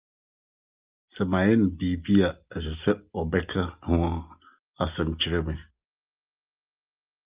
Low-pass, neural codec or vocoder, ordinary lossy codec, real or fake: 3.6 kHz; none; Opus, 24 kbps; real